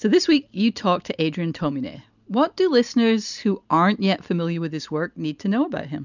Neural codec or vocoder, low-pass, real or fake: none; 7.2 kHz; real